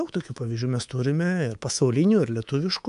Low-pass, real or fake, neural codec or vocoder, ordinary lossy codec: 10.8 kHz; fake; codec, 24 kHz, 3.1 kbps, DualCodec; AAC, 96 kbps